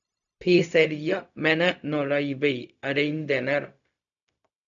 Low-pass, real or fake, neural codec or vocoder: 7.2 kHz; fake; codec, 16 kHz, 0.4 kbps, LongCat-Audio-Codec